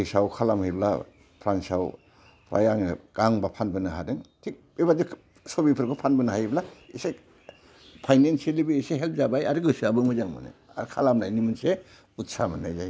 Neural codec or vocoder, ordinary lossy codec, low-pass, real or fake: none; none; none; real